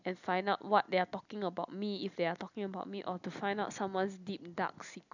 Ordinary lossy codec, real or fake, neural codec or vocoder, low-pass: none; real; none; 7.2 kHz